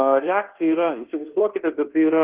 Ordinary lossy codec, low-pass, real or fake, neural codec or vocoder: Opus, 16 kbps; 3.6 kHz; fake; codec, 24 kHz, 1.2 kbps, DualCodec